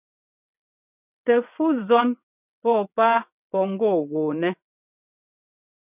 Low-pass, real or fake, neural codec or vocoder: 3.6 kHz; fake; vocoder, 22.05 kHz, 80 mel bands, WaveNeXt